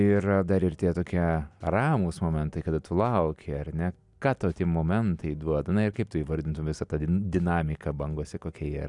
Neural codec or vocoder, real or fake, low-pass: none; real; 10.8 kHz